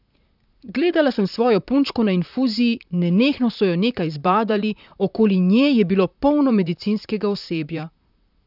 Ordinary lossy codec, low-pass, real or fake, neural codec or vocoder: none; 5.4 kHz; fake; vocoder, 44.1 kHz, 128 mel bands, Pupu-Vocoder